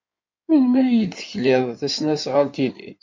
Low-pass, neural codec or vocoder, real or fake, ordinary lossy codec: 7.2 kHz; codec, 16 kHz in and 24 kHz out, 2.2 kbps, FireRedTTS-2 codec; fake; MP3, 48 kbps